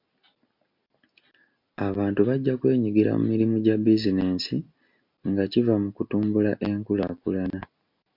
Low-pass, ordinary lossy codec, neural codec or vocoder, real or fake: 5.4 kHz; MP3, 48 kbps; none; real